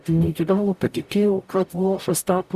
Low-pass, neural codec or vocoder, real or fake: 14.4 kHz; codec, 44.1 kHz, 0.9 kbps, DAC; fake